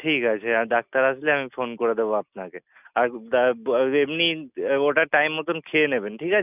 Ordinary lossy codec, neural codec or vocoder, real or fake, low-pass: none; none; real; 3.6 kHz